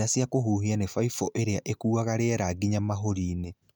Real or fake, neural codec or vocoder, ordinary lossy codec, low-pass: real; none; none; none